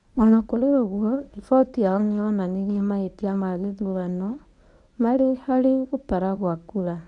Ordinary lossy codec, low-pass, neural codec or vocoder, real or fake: none; 10.8 kHz; codec, 24 kHz, 0.9 kbps, WavTokenizer, medium speech release version 1; fake